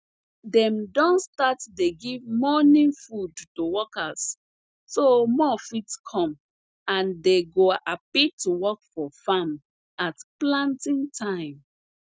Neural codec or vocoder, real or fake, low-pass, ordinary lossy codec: none; real; none; none